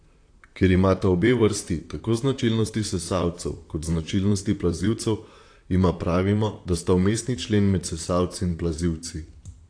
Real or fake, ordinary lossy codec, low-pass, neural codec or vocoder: fake; AAC, 48 kbps; 9.9 kHz; vocoder, 44.1 kHz, 128 mel bands, Pupu-Vocoder